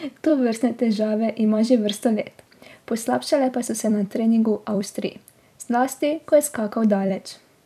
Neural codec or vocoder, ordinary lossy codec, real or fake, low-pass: vocoder, 44.1 kHz, 128 mel bands every 256 samples, BigVGAN v2; none; fake; 14.4 kHz